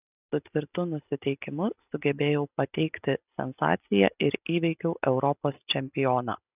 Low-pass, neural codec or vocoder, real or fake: 3.6 kHz; none; real